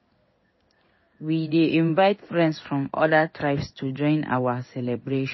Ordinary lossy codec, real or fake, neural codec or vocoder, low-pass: MP3, 24 kbps; fake; codec, 16 kHz in and 24 kHz out, 1 kbps, XY-Tokenizer; 7.2 kHz